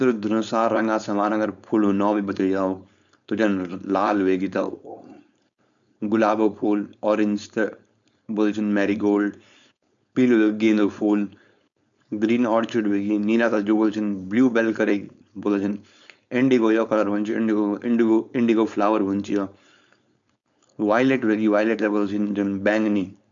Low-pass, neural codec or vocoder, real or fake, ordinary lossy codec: 7.2 kHz; codec, 16 kHz, 4.8 kbps, FACodec; fake; none